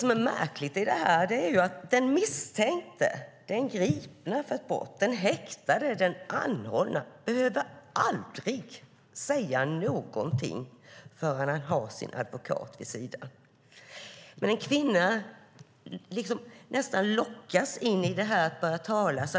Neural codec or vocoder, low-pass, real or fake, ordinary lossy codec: none; none; real; none